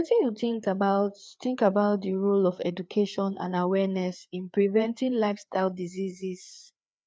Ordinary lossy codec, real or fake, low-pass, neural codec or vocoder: none; fake; none; codec, 16 kHz, 4 kbps, FreqCodec, larger model